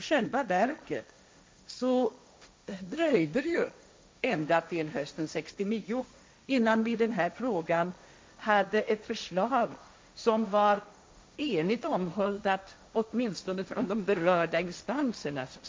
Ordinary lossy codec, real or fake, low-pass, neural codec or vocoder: none; fake; none; codec, 16 kHz, 1.1 kbps, Voila-Tokenizer